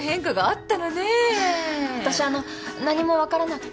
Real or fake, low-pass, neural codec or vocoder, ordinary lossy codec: real; none; none; none